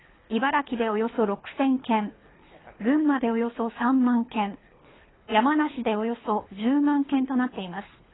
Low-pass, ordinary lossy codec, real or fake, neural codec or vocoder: 7.2 kHz; AAC, 16 kbps; fake; codec, 24 kHz, 3 kbps, HILCodec